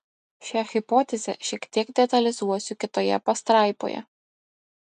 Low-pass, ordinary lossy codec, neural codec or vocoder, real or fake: 9.9 kHz; MP3, 96 kbps; none; real